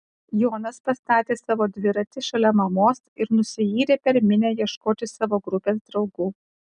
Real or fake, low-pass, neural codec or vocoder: real; 9.9 kHz; none